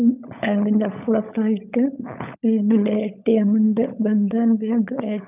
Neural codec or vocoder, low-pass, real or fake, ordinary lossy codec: codec, 16 kHz, 16 kbps, FunCodec, trained on LibriTTS, 50 frames a second; 3.6 kHz; fake; none